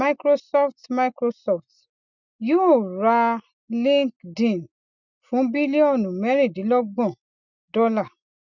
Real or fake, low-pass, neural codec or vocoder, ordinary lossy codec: real; 7.2 kHz; none; none